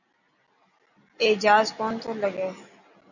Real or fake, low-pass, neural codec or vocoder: real; 7.2 kHz; none